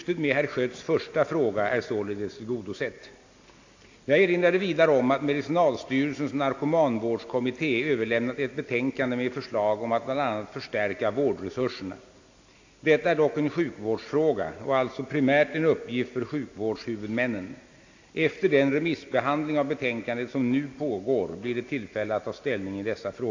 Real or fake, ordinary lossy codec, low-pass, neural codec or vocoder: real; AAC, 48 kbps; 7.2 kHz; none